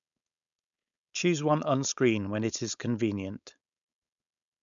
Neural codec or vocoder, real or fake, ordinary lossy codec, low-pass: codec, 16 kHz, 4.8 kbps, FACodec; fake; MP3, 96 kbps; 7.2 kHz